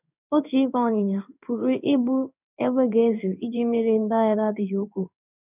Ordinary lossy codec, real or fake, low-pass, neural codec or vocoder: none; fake; 3.6 kHz; codec, 16 kHz in and 24 kHz out, 1 kbps, XY-Tokenizer